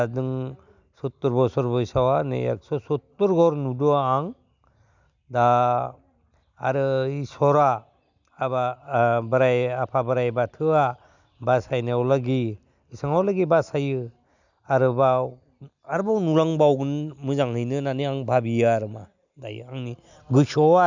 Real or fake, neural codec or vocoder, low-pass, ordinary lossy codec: real; none; 7.2 kHz; none